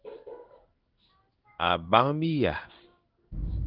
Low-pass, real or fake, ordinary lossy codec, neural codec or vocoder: 5.4 kHz; fake; Opus, 32 kbps; codec, 16 kHz in and 24 kHz out, 1 kbps, XY-Tokenizer